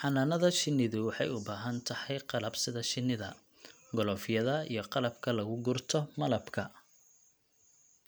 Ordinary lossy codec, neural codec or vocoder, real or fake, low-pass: none; none; real; none